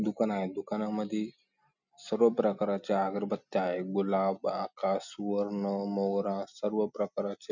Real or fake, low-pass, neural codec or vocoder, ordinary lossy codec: real; 7.2 kHz; none; none